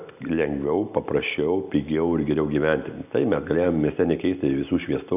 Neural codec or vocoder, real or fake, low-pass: none; real; 3.6 kHz